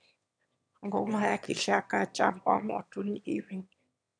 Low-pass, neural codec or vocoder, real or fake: 9.9 kHz; autoencoder, 22.05 kHz, a latent of 192 numbers a frame, VITS, trained on one speaker; fake